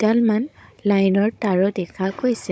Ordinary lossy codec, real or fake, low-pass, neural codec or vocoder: none; fake; none; codec, 16 kHz, 16 kbps, FunCodec, trained on Chinese and English, 50 frames a second